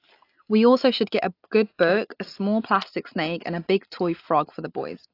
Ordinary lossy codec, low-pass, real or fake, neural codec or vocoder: AAC, 32 kbps; 5.4 kHz; real; none